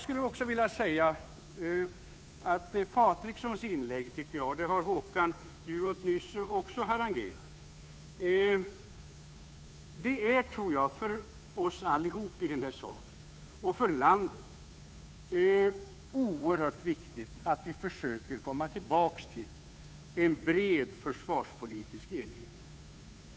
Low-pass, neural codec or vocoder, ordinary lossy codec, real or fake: none; codec, 16 kHz, 2 kbps, FunCodec, trained on Chinese and English, 25 frames a second; none; fake